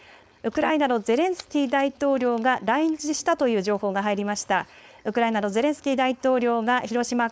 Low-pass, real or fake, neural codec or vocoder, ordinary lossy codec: none; fake; codec, 16 kHz, 4.8 kbps, FACodec; none